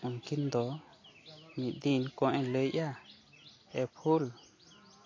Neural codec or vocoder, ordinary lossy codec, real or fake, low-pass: none; AAC, 32 kbps; real; 7.2 kHz